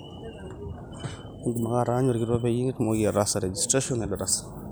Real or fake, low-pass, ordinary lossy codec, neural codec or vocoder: real; none; none; none